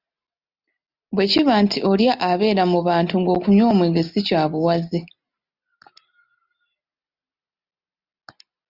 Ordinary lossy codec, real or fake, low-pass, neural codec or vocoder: Opus, 64 kbps; real; 5.4 kHz; none